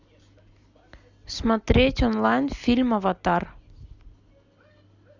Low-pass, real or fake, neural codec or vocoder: 7.2 kHz; real; none